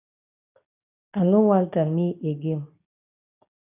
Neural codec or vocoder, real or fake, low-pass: codec, 24 kHz, 0.9 kbps, WavTokenizer, medium speech release version 2; fake; 3.6 kHz